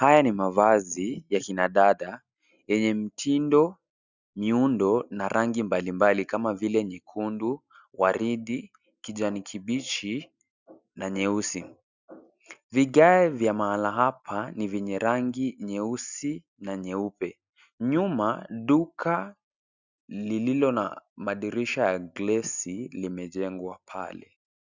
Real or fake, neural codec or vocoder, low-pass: real; none; 7.2 kHz